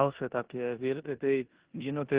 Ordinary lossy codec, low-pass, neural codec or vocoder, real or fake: Opus, 16 kbps; 3.6 kHz; codec, 16 kHz in and 24 kHz out, 0.9 kbps, LongCat-Audio-Codec, four codebook decoder; fake